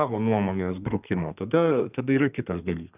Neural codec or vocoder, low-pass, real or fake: codec, 32 kHz, 1.9 kbps, SNAC; 3.6 kHz; fake